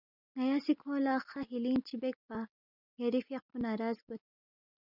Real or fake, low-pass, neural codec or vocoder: real; 5.4 kHz; none